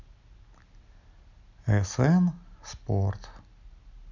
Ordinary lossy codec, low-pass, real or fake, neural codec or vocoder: none; 7.2 kHz; real; none